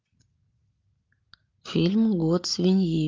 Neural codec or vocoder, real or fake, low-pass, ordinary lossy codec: none; real; 7.2 kHz; Opus, 32 kbps